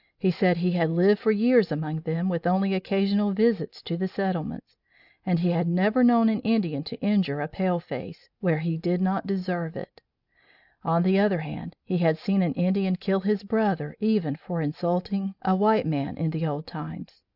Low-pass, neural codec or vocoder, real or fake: 5.4 kHz; none; real